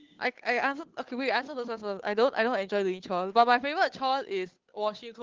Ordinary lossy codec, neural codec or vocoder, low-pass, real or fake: Opus, 16 kbps; codec, 16 kHz, 8 kbps, FunCodec, trained on Chinese and English, 25 frames a second; 7.2 kHz; fake